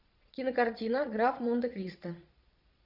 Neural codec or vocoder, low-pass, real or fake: vocoder, 22.05 kHz, 80 mel bands, Vocos; 5.4 kHz; fake